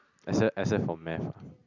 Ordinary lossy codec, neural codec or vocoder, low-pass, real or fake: none; none; 7.2 kHz; real